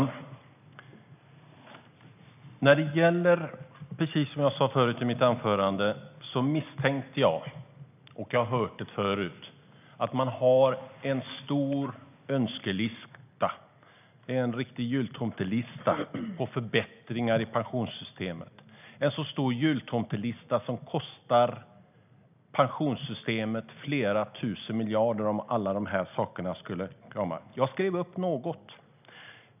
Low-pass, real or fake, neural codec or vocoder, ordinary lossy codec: 3.6 kHz; real; none; none